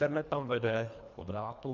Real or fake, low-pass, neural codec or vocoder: fake; 7.2 kHz; codec, 24 kHz, 1.5 kbps, HILCodec